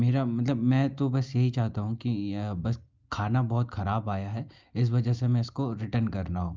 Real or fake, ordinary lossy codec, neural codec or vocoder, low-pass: real; Opus, 24 kbps; none; 7.2 kHz